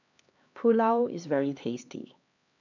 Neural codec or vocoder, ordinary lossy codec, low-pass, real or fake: codec, 16 kHz, 2 kbps, X-Codec, HuBERT features, trained on LibriSpeech; none; 7.2 kHz; fake